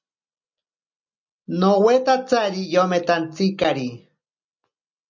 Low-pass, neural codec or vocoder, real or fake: 7.2 kHz; none; real